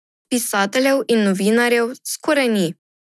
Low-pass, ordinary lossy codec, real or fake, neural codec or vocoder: none; none; real; none